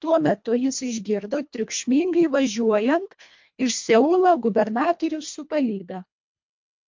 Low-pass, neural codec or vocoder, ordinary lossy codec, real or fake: 7.2 kHz; codec, 24 kHz, 1.5 kbps, HILCodec; MP3, 48 kbps; fake